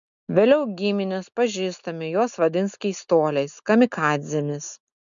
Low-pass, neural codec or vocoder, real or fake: 7.2 kHz; none; real